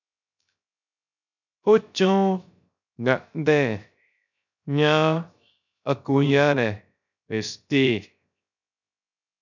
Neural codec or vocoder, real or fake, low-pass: codec, 16 kHz, 0.3 kbps, FocalCodec; fake; 7.2 kHz